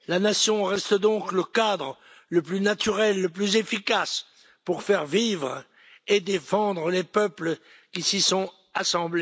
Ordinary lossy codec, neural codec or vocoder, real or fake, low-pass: none; none; real; none